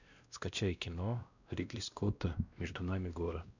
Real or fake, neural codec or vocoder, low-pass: fake; codec, 16 kHz, 1 kbps, X-Codec, WavLM features, trained on Multilingual LibriSpeech; 7.2 kHz